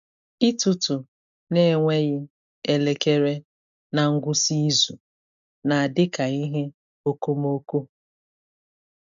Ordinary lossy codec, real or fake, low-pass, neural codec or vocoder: MP3, 96 kbps; real; 7.2 kHz; none